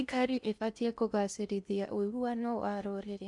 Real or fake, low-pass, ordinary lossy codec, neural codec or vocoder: fake; 10.8 kHz; none; codec, 16 kHz in and 24 kHz out, 0.6 kbps, FocalCodec, streaming, 4096 codes